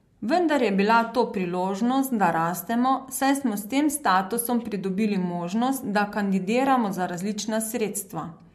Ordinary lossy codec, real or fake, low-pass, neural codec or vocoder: MP3, 64 kbps; real; 14.4 kHz; none